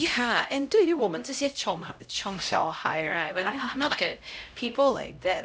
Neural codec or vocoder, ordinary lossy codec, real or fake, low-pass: codec, 16 kHz, 0.5 kbps, X-Codec, HuBERT features, trained on LibriSpeech; none; fake; none